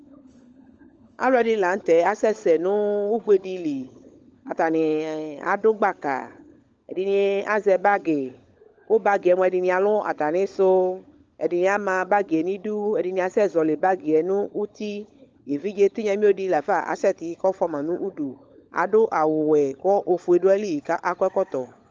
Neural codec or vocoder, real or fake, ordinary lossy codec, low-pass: codec, 16 kHz, 16 kbps, FunCodec, trained on LibriTTS, 50 frames a second; fake; Opus, 24 kbps; 7.2 kHz